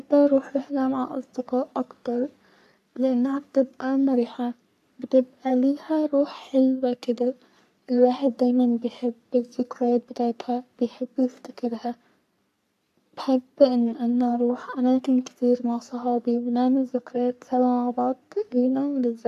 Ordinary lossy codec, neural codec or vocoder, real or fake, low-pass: none; codec, 44.1 kHz, 3.4 kbps, Pupu-Codec; fake; 14.4 kHz